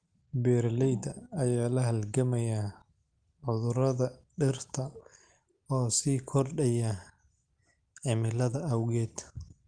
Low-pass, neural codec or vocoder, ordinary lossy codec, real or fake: 9.9 kHz; none; Opus, 24 kbps; real